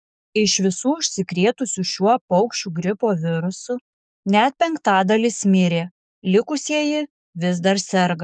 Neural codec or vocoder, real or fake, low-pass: codec, 44.1 kHz, 7.8 kbps, DAC; fake; 9.9 kHz